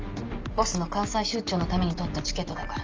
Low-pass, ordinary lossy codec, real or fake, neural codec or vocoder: 7.2 kHz; Opus, 24 kbps; real; none